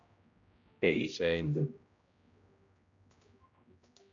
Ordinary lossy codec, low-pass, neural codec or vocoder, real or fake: MP3, 96 kbps; 7.2 kHz; codec, 16 kHz, 0.5 kbps, X-Codec, HuBERT features, trained on general audio; fake